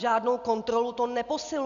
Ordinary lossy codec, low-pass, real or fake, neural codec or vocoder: MP3, 96 kbps; 7.2 kHz; real; none